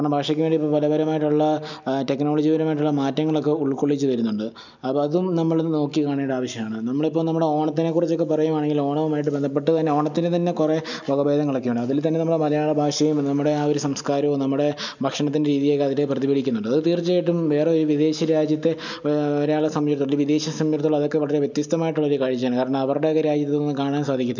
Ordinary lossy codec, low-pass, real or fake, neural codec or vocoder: none; 7.2 kHz; real; none